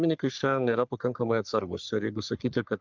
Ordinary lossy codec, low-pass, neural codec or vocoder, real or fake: Opus, 24 kbps; 7.2 kHz; codec, 44.1 kHz, 3.4 kbps, Pupu-Codec; fake